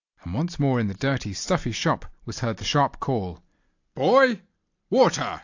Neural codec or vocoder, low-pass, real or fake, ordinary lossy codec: none; 7.2 kHz; real; AAC, 48 kbps